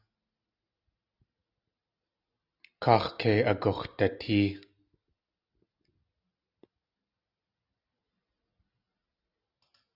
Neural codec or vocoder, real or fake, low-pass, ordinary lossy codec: none; real; 5.4 kHz; Opus, 64 kbps